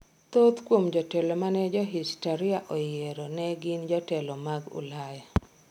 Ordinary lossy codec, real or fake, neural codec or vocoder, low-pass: none; real; none; 19.8 kHz